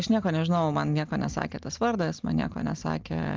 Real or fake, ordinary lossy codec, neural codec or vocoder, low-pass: real; Opus, 24 kbps; none; 7.2 kHz